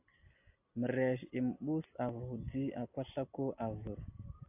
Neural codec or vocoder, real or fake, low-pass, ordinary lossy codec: none; real; 3.6 kHz; AAC, 32 kbps